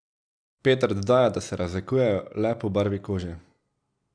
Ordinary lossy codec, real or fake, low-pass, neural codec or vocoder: none; real; 9.9 kHz; none